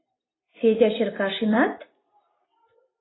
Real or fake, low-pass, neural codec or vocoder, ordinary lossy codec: fake; 7.2 kHz; vocoder, 44.1 kHz, 128 mel bands every 256 samples, BigVGAN v2; AAC, 16 kbps